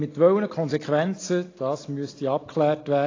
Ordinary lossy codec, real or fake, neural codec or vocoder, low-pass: AAC, 32 kbps; real; none; 7.2 kHz